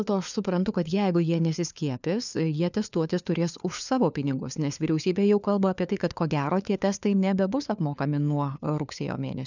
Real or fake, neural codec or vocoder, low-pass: fake; codec, 16 kHz, 4 kbps, FunCodec, trained on LibriTTS, 50 frames a second; 7.2 kHz